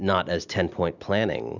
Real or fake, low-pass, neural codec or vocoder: real; 7.2 kHz; none